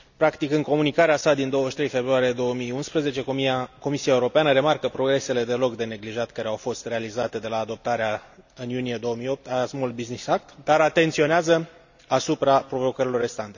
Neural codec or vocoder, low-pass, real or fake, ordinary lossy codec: none; 7.2 kHz; real; none